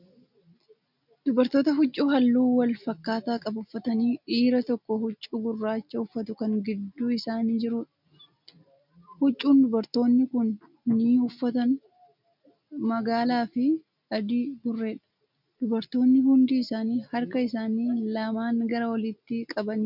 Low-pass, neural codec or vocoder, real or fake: 5.4 kHz; none; real